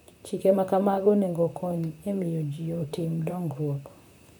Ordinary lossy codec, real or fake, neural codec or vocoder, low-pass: none; fake; vocoder, 44.1 kHz, 128 mel bands, Pupu-Vocoder; none